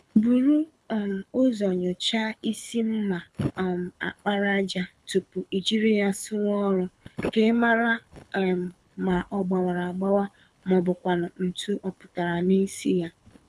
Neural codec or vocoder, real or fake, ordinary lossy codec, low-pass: codec, 24 kHz, 6 kbps, HILCodec; fake; none; none